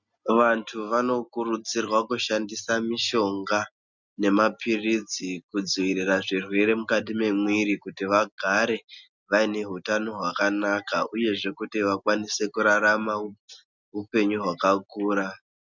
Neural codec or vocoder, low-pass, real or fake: none; 7.2 kHz; real